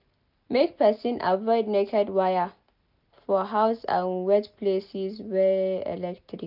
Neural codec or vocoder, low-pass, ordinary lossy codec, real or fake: none; 5.4 kHz; none; real